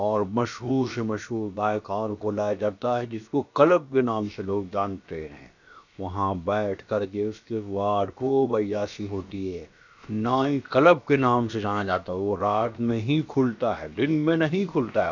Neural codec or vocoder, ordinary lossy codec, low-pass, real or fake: codec, 16 kHz, about 1 kbps, DyCAST, with the encoder's durations; none; 7.2 kHz; fake